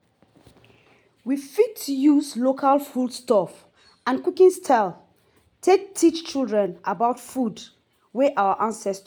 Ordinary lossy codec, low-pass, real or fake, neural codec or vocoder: none; none; real; none